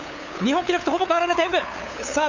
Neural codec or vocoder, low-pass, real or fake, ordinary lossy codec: codec, 16 kHz, 8 kbps, FunCodec, trained on LibriTTS, 25 frames a second; 7.2 kHz; fake; none